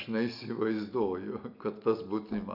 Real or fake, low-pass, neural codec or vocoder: real; 5.4 kHz; none